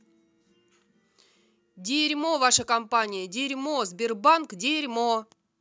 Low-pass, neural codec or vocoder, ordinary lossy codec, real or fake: none; none; none; real